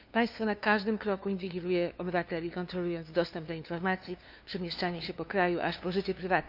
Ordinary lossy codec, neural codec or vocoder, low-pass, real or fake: none; codec, 16 kHz, 2 kbps, FunCodec, trained on LibriTTS, 25 frames a second; 5.4 kHz; fake